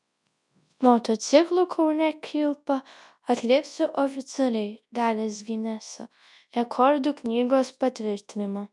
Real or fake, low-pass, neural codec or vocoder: fake; 10.8 kHz; codec, 24 kHz, 0.9 kbps, WavTokenizer, large speech release